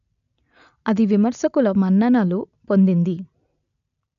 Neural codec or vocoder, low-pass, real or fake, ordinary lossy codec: none; 7.2 kHz; real; none